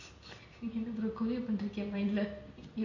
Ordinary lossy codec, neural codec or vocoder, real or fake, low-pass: AAC, 48 kbps; none; real; 7.2 kHz